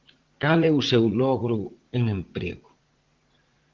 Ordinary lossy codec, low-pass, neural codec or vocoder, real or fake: Opus, 16 kbps; 7.2 kHz; vocoder, 44.1 kHz, 80 mel bands, Vocos; fake